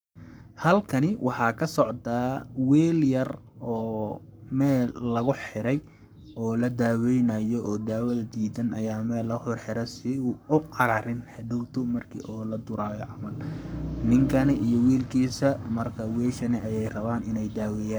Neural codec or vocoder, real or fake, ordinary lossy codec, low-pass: codec, 44.1 kHz, 7.8 kbps, Pupu-Codec; fake; none; none